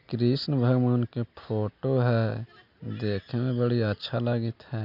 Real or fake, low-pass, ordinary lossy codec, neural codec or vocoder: real; 5.4 kHz; none; none